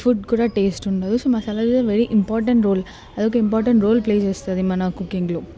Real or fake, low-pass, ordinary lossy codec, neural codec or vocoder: real; none; none; none